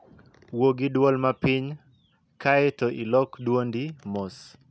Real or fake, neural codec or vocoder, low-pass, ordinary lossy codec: real; none; none; none